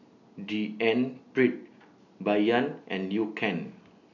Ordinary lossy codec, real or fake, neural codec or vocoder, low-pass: none; real; none; 7.2 kHz